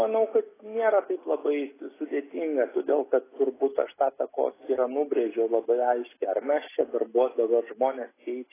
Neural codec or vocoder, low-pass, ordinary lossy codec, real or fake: none; 3.6 kHz; AAC, 16 kbps; real